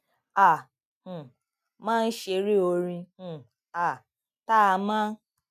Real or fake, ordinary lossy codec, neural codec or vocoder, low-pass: real; none; none; 14.4 kHz